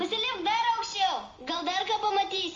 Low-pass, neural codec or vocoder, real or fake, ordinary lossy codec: 7.2 kHz; none; real; Opus, 32 kbps